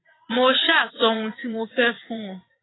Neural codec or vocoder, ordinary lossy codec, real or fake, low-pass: vocoder, 22.05 kHz, 80 mel bands, WaveNeXt; AAC, 16 kbps; fake; 7.2 kHz